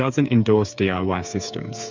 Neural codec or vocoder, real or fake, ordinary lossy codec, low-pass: codec, 16 kHz, 8 kbps, FreqCodec, smaller model; fake; MP3, 64 kbps; 7.2 kHz